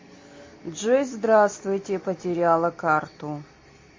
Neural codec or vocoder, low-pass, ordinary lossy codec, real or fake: none; 7.2 kHz; MP3, 32 kbps; real